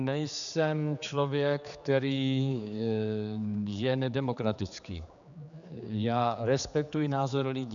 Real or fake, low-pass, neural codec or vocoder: fake; 7.2 kHz; codec, 16 kHz, 4 kbps, X-Codec, HuBERT features, trained on general audio